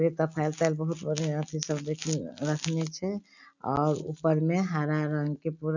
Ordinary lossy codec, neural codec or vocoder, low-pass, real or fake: none; none; 7.2 kHz; real